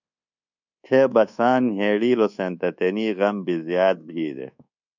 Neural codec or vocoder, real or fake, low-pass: codec, 24 kHz, 1.2 kbps, DualCodec; fake; 7.2 kHz